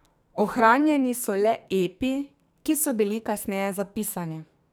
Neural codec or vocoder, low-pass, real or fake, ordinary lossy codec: codec, 44.1 kHz, 2.6 kbps, SNAC; none; fake; none